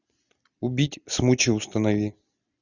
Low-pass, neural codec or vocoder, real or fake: 7.2 kHz; none; real